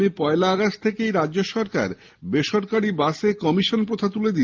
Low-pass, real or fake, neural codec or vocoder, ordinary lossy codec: 7.2 kHz; real; none; Opus, 32 kbps